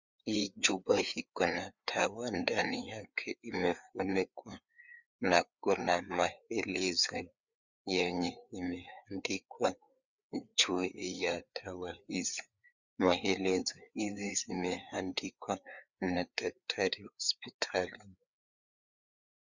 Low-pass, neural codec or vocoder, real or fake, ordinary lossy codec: 7.2 kHz; codec, 16 kHz, 4 kbps, FreqCodec, larger model; fake; Opus, 64 kbps